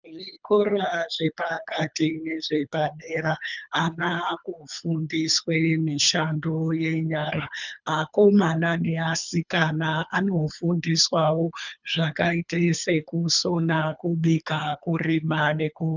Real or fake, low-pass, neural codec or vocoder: fake; 7.2 kHz; codec, 24 kHz, 3 kbps, HILCodec